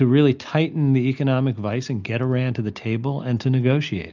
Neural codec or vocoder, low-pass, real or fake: none; 7.2 kHz; real